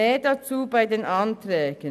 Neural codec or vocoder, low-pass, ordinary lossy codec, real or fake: none; 14.4 kHz; none; real